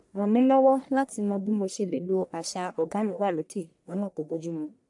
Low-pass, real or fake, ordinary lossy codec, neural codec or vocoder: 10.8 kHz; fake; AAC, 64 kbps; codec, 44.1 kHz, 1.7 kbps, Pupu-Codec